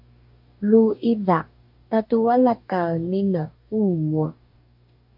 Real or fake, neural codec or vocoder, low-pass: fake; codec, 44.1 kHz, 2.6 kbps, DAC; 5.4 kHz